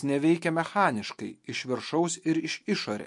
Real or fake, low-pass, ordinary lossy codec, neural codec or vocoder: real; 10.8 kHz; MP3, 48 kbps; none